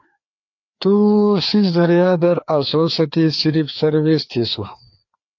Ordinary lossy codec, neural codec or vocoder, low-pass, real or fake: AAC, 48 kbps; codec, 16 kHz, 2 kbps, FreqCodec, larger model; 7.2 kHz; fake